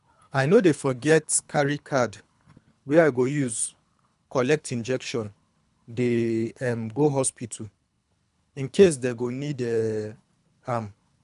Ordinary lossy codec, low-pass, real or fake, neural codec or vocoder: none; 10.8 kHz; fake; codec, 24 kHz, 3 kbps, HILCodec